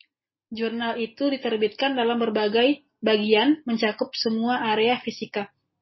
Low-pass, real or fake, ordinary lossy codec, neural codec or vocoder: 7.2 kHz; real; MP3, 24 kbps; none